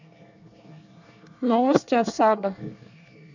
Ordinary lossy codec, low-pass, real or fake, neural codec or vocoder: none; 7.2 kHz; fake; codec, 24 kHz, 1 kbps, SNAC